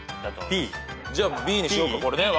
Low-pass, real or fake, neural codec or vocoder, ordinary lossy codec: none; real; none; none